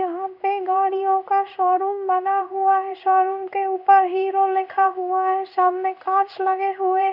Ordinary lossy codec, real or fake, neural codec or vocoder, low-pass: none; fake; codec, 16 kHz in and 24 kHz out, 1 kbps, XY-Tokenizer; 5.4 kHz